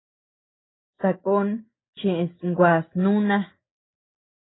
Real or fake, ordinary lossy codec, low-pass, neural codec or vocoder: real; AAC, 16 kbps; 7.2 kHz; none